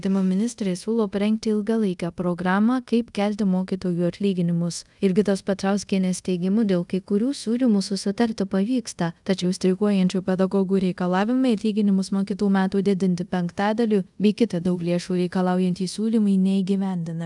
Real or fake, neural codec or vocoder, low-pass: fake; codec, 24 kHz, 0.5 kbps, DualCodec; 10.8 kHz